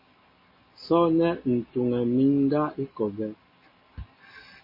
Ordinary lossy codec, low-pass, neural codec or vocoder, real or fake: MP3, 24 kbps; 5.4 kHz; none; real